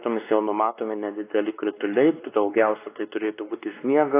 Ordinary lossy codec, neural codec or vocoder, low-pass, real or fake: AAC, 24 kbps; codec, 16 kHz, 2 kbps, X-Codec, WavLM features, trained on Multilingual LibriSpeech; 3.6 kHz; fake